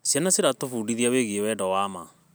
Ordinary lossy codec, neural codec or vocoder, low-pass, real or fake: none; none; none; real